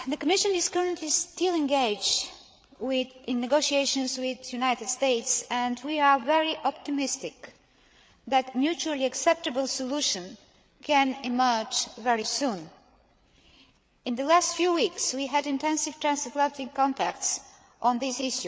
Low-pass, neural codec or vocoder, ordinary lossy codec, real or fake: none; codec, 16 kHz, 8 kbps, FreqCodec, larger model; none; fake